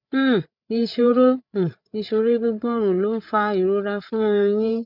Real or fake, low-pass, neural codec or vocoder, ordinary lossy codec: fake; 5.4 kHz; codec, 16 kHz, 8 kbps, FreqCodec, larger model; none